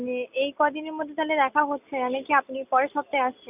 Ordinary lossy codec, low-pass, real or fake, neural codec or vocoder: none; 3.6 kHz; real; none